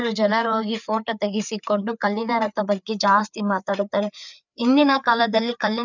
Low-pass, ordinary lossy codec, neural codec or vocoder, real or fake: 7.2 kHz; none; codec, 16 kHz, 4 kbps, FreqCodec, larger model; fake